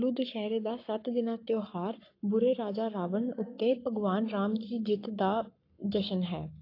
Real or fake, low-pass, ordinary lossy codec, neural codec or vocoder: fake; 5.4 kHz; AAC, 32 kbps; codec, 44.1 kHz, 7.8 kbps, Pupu-Codec